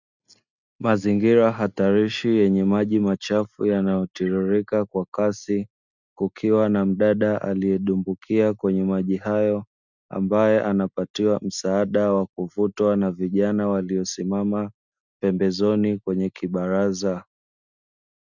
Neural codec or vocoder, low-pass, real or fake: none; 7.2 kHz; real